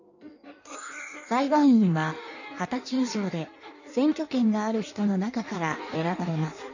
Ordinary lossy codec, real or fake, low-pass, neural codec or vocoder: none; fake; 7.2 kHz; codec, 16 kHz in and 24 kHz out, 1.1 kbps, FireRedTTS-2 codec